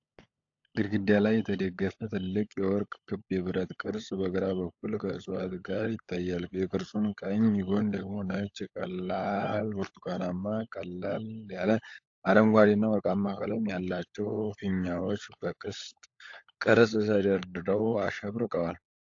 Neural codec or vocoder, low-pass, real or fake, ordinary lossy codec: codec, 16 kHz, 16 kbps, FunCodec, trained on LibriTTS, 50 frames a second; 7.2 kHz; fake; AAC, 48 kbps